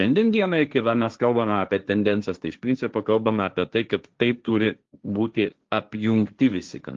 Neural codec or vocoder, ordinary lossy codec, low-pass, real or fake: codec, 16 kHz, 1.1 kbps, Voila-Tokenizer; Opus, 32 kbps; 7.2 kHz; fake